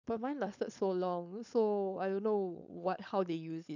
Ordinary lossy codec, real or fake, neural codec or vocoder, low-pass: none; fake; codec, 16 kHz, 4.8 kbps, FACodec; 7.2 kHz